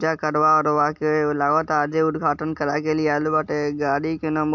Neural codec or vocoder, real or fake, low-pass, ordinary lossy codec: none; real; 7.2 kHz; MP3, 64 kbps